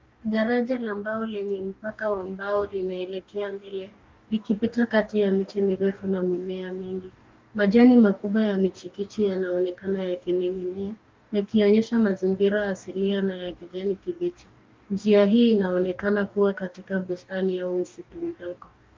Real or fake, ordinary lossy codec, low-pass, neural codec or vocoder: fake; Opus, 32 kbps; 7.2 kHz; codec, 44.1 kHz, 2.6 kbps, DAC